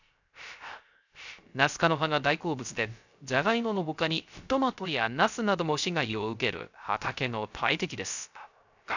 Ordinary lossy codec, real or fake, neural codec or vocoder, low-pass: none; fake; codec, 16 kHz, 0.3 kbps, FocalCodec; 7.2 kHz